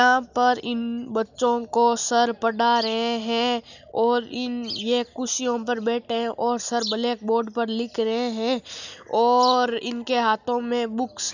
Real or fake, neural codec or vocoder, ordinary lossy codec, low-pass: real; none; none; 7.2 kHz